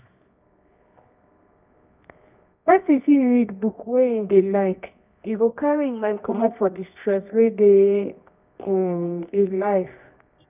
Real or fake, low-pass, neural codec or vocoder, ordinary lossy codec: fake; 3.6 kHz; codec, 24 kHz, 0.9 kbps, WavTokenizer, medium music audio release; none